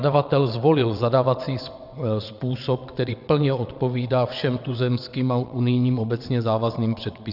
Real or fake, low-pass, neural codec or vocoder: fake; 5.4 kHz; vocoder, 22.05 kHz, 80 mel bands, WaveNeXt